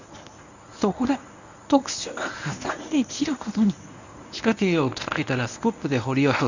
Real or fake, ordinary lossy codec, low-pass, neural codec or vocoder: fake; none; 7.2 kHz; codec, 24 kHz, 0.9 kbps, WavTokenizer, medium speech release version 1